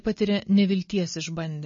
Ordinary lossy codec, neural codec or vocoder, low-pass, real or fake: MP3, 32 kbps; none; 7.2 kHz; real